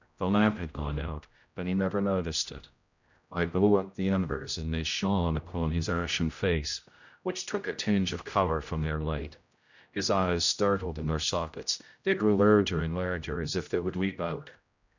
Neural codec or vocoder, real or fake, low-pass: codec, 16 kHz, 0.5 kbps, X-Codec, HuBERT features, trained on general audio; fake; 7.2 kHz